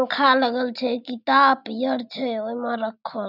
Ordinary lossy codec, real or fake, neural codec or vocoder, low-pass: none; real; none; 5.4 kHz